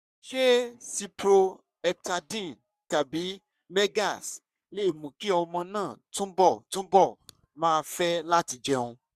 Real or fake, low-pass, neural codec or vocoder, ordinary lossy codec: fake; 14.4 kHz; codec, 44.1 kHz, 3.4 kbps, Pupu-Codec; none